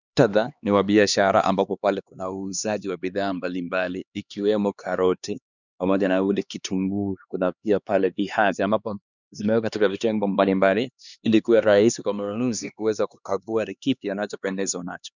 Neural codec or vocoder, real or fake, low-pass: codec, 16 kHz, 2 kbps, X-Codec, HuBERT features, trained on LibriSpeech; fake; 7.2 kHz